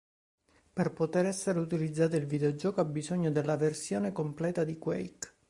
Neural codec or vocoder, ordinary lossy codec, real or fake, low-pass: none; Opus, 64 kbps; real; 10.8 kHz